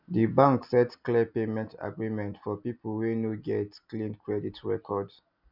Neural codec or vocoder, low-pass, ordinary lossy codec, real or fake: none; 5.4 kHz; none; real